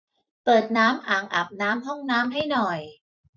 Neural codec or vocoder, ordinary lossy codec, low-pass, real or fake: vocoder, 44.1 kHz, 128 mel bands every 256 samples, BigVGAN v2; none; 7.2 kHz; fake